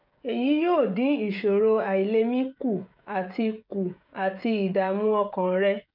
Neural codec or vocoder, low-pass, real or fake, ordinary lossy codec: codec, 16 kHz, 16 kbps, FreqCodec, smaller model; 5.4 kHz; fake; none